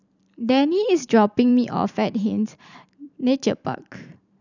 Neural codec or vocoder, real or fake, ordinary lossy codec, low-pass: vocoder, 44.1 kHz, 128 mel bands every 512 samples, BigVGAN v2; fake; none; 7.2 kHz